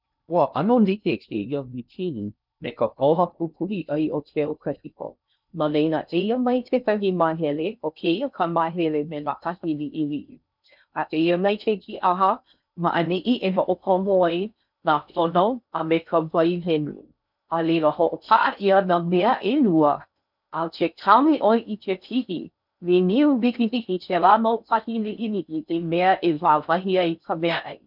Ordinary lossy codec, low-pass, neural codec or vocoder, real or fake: none; 5.4 kHz; codec, 16 kHz in and 24 kHz out, 0.6 kbps, FocalCodec, streaming, 2048 codes; fake